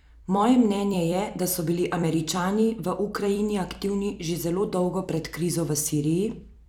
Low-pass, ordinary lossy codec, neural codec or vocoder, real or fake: 19.8 kHz; none; vocoder, 44.1 kHz, 128 mel bands every 256 samples, BigVGAN v2; fake